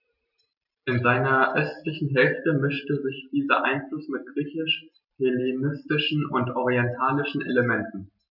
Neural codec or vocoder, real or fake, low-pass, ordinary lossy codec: none; real; 5.4 kHz; none